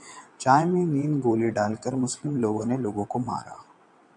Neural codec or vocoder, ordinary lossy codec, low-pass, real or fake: vocoder, 22.05 kHz, 80 mel bands, WaveNeXt; MP3, 64 kbps; 9.9 kHz; fake